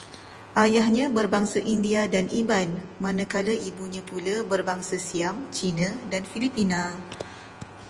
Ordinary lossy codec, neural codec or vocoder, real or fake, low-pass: Opus, 24 kbps; vocoder, 48 kHz, 128 mel bands, Vocos; fake; 10.8 kHz